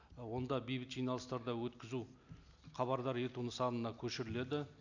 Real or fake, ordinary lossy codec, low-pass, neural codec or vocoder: real; none; 7.2 kHz; none